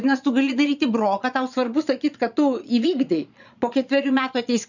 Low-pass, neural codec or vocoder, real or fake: 7.2 kHz; vocoder, 22.05 kHz, 80 mel bands, Vocos; fake